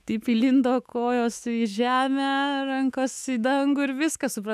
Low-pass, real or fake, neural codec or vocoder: 14.4 kHz; fake; autoencoder, 48 kHz, 128 numbers a frame, DAC-VAE, trained on Japanese speech